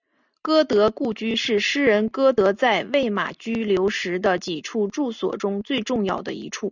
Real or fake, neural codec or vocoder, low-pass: real; none; 7.2 kHz